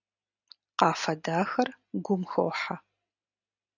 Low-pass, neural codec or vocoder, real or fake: 7.2 kHz; none; real